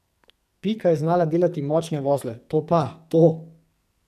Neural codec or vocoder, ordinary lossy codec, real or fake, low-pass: codec, 44.1 kHz, 2.6 kbps, SNAC; AAC, 96 kbps; fake; 14.4 kHz